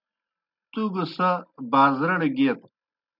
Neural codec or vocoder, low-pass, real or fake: none; 5.4 kHz; real